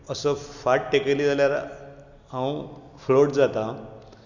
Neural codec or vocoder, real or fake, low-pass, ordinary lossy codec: none; real; 7.2 kHz; none